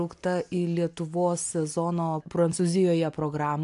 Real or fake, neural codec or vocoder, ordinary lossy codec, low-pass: real; none; AAC, 64 kbps; 10.8 kHz